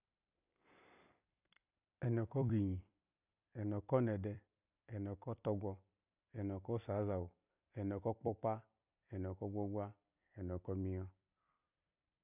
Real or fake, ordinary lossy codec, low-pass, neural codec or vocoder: fake; none; 3.6 kHz; vocoder, 44.1 kHz, 128 mel bands every 256 samples, BigVGAN v2